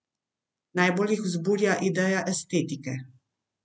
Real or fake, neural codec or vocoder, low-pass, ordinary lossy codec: real; none; none; none